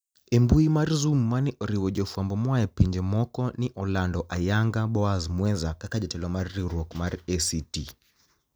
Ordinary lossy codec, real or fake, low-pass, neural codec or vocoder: none; real; none; none